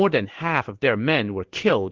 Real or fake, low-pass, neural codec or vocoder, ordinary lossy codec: fake; 7.2 kHz; codec, 16 kHz in and 24 kHz out, 1 kbps, XY-Tokenizer; Opus, 16 kbps